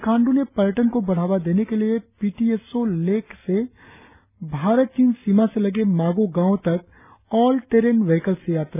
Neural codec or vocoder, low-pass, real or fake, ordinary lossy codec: none; 3.6 kHz; real; none